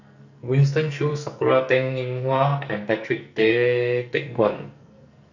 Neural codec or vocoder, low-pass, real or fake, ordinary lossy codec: codec, 44.1 kHz, 2.6 kbps, SNAC; 7.2 kHz; fake; none